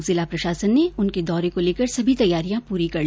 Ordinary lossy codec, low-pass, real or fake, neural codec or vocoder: none; none; real; none